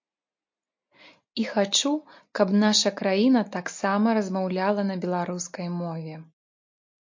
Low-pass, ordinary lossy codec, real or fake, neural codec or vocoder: 7.2 kHz; MP3, 48 kbps; real; none